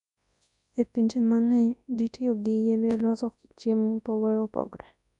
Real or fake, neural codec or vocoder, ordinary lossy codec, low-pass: fake; codec, 24 kHz, 0.9 kbps, WavTokenizer, large speech release; none; 10.8 kHz